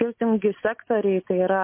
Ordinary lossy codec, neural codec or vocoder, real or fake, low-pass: MP3, 24 kbps; none; real; 3.6 kHz